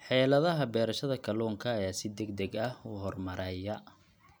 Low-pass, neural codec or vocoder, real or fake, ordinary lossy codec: none; none; real; none